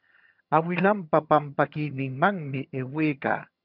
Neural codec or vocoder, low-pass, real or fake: vocoder, 22.05 kHz, 80 mel bands, HiFi-GAN; 5.4 kHz; fake